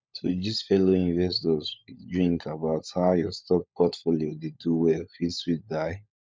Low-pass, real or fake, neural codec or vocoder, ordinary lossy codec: none; fake; codec, 16 kHz, 16 kbps, FunCodec, trained on LibriTTS, 50 frames a second; none